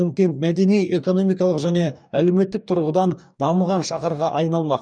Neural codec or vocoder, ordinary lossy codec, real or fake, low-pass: codec, 44.1 kHz, 2.6 kbps, DAC; none; fake; 9.9 kHz